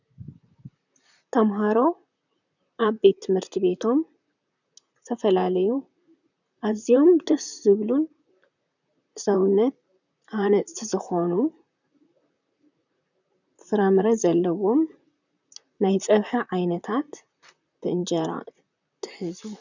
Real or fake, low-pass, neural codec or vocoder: fake; 7.2 kHz; vocoder, 44.1 kHz, 128 mel bands, Pupu-Vocoder